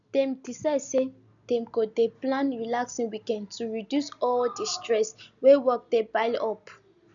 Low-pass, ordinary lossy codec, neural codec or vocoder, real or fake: 7.2 kHz; none; none; real